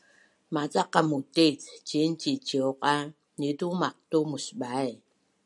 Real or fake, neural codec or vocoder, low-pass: real; none; 10.8 kHz